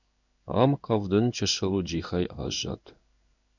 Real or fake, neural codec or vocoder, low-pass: fake; codec, 16 kHz in and 24 kHz out, 1 kbps, XY-Tokenizer; 7.2 kHz